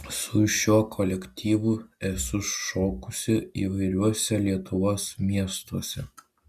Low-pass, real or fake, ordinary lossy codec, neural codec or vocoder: 14.4 kHz; real; Opus, 64 kbps; none